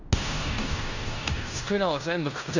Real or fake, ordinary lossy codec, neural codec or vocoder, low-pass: fake; none; codec, 16 kHz in and 24 kHz out, 0.9 kbps, LongCat-Audio-Codec, fine tuned four codebook decoder; 7.2 kHz